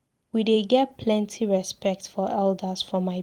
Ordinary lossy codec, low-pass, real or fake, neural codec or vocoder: Opus, 24 kbps; 19.8 kHz; fake; vocoder, 44.1 kHz, 128 mel bands every 256 samples, BigVGAN v2